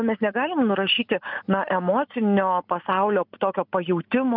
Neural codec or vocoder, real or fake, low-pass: none; real; 5.4 kHz